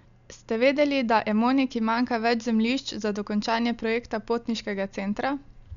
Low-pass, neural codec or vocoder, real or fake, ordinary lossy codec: 7.2 kHz; none; real; none